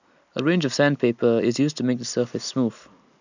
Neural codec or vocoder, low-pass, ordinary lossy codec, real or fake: none; 7.2 kHz; none; real